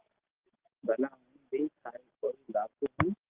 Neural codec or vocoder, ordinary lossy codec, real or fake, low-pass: none; Opus, 16 kbps; real; 3.6 kHz